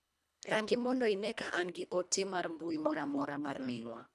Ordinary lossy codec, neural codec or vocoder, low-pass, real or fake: none; codec, 24 kHz, 1.5 kbps, HILCodec; none; fake